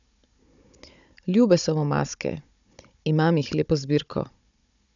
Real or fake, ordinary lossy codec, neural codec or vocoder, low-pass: fake; none; codec, 16 kHz, 16 kbps, FunCodec, trained on Chinese and English, 50 frames a second; 7.2 kHz